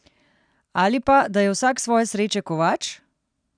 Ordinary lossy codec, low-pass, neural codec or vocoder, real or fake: none; 9.9 kHz; none; real